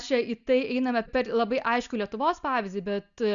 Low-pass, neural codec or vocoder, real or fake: 7.2 kHz; none; real